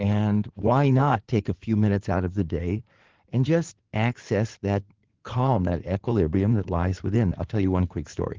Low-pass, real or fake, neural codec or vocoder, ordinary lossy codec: 7.2 kHz; fake; codec, 16 kHz in and 24 kHz out, 2.2 kbps, FireRedTTS-2 codec; Opus, 16 kbps